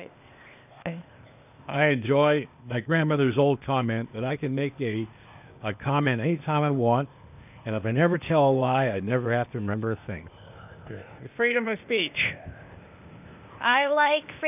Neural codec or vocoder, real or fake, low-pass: codec, 16 kHz, 0.8 kbps, ZipCodec; fake; 3.6 kHz